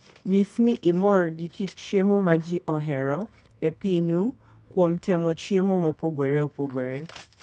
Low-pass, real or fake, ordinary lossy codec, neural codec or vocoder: 10.8 kHz; fake; none; codec, 24 kHz, 0.9 kbps, WavTokenizer, medium music audio release